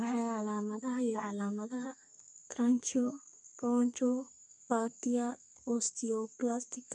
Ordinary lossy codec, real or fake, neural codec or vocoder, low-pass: none; fake; codec, 32 kHz, 1.9 kbps, SNAC; 10.8 kHz